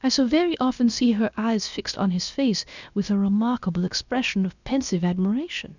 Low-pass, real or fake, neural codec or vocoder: 7.2 kHz; fake; codec, 16 kHz, about 1 kbps, DyCAST, with the encoder's durations